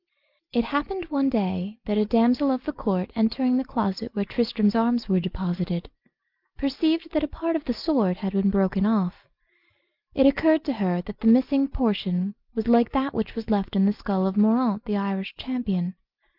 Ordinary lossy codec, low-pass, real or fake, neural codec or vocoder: Opus, 24 kbps; 5.4 kHz; real; none